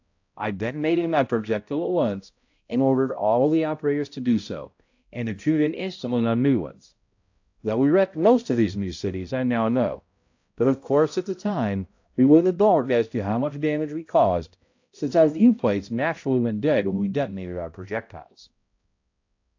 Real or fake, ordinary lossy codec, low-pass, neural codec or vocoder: fake; AAC, 48 kbps; 7.2 kHz; codec, 16 kHz, 0.5 kbps, X-Codec, HuBERT features, trained on balanced general audio